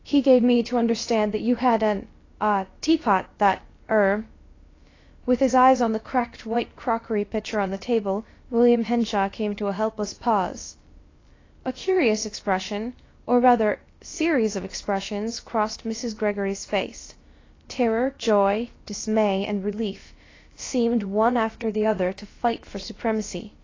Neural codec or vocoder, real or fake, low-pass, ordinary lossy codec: codec, 16 kHz, about 1 kbps, DyCAST, with the encoder's durations; fake; 7.2 kHz; AAC, 32 kbps